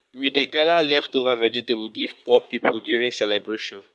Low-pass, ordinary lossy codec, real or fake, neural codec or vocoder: none; none; fake; codec, 24 kHz, 1 kbps, SNAC